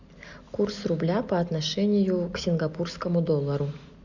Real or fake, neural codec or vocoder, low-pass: real; none; 7.2 kHz